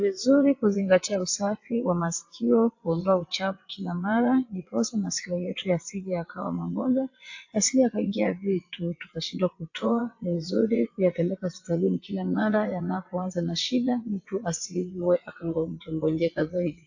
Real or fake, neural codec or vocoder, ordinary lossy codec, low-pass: fake; vocoder, 22.05 kHz, 80 mel bands, WaveNeXt; AAC, 48 kbps; 7.2 kHz